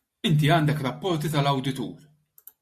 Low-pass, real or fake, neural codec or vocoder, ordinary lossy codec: 14.4 kHz; real; none; MP3, 64 kbps